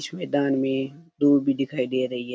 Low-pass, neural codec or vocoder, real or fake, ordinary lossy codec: none; none; real; none